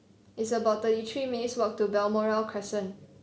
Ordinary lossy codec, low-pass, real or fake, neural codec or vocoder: none; none; real; none